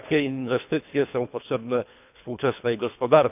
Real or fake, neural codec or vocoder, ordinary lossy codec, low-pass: fake; codec, 24 kHz, 3 kbps, HILCodec; none; 3.6 kHz